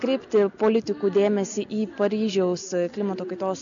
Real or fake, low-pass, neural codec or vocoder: real; 7.2 kHz; none